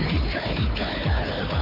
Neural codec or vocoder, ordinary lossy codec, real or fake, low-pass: codec, 16 kHz, 4 kbps, FunCodec, trained on Chinese and English, 50 frames a second; none; fake; 5.4 kHz